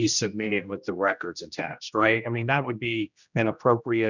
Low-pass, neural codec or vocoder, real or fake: 7.2 kHz; codec, 16 kHz, 1 kbps, X-Codec, HuBERT features, trained on general audio; fake